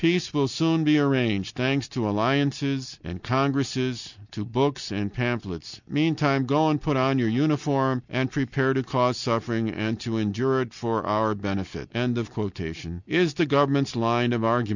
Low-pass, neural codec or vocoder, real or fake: 7.2 kHz; none; real